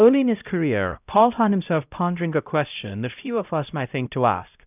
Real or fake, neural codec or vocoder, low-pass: fake; codec, 16 kHz, 0.5 kbps, X-Codec, HuBERT features, trained on LibriSpeech; 3.6 kHz